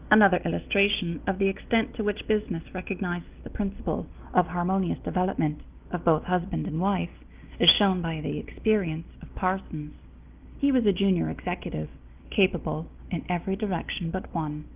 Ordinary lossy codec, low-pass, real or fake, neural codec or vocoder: Opus, 32 kbps; 3.6 kHz; real; none